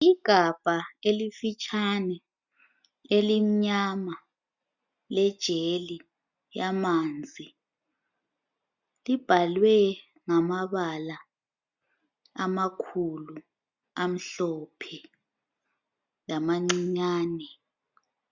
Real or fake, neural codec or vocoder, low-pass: real; none; 7.2 kHz